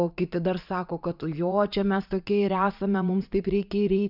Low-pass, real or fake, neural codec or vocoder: 5.4 kHz; fake; vocoder, 44.1 kHz, 80 mel bands, Vocos